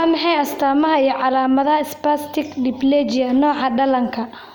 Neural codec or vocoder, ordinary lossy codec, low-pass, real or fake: none; none; 19.8 kHz; real